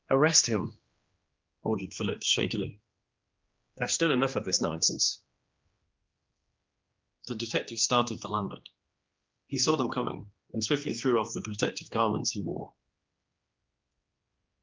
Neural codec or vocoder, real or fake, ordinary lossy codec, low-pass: codec, 16 kHz, 2 kbps, X-Codec, HuBERT features, trained on general audio; fake; Opus, 32 kbps; 7.2 kHz